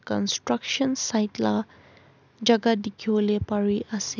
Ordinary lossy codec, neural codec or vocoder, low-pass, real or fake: none; none; 7.2 kHz; real